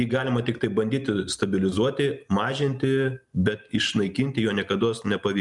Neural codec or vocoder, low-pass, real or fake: none; 10.8 kHz; real